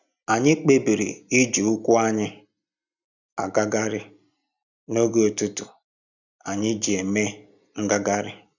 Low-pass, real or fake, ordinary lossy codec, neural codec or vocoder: 7.2 kHz; real; none; none